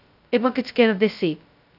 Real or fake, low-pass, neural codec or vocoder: fake; 5.4 kHz; codec, 16 kHz, 0.2 kbps, FocalCodec